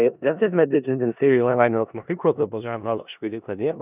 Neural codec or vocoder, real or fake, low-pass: codec, 16 kHz in and 24 kHz out, 0.4 kbps, LongCat-Audio-Codec, four codebook decoder; fake; 3.6 kHz